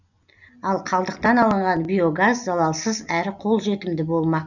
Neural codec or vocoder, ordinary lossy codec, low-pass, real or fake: none; none; 7.2 kHz; real